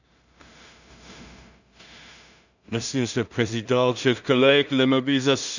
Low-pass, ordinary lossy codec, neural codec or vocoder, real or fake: 7.2 kHz; none; codec, 16 kHz in and 24 kHz out, 0.4 kbps, LongCat-Audio-Codec, two codebook decoder; fake